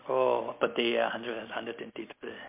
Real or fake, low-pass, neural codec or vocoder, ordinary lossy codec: real; 3.6 kHz; none; MP3, 32 kbps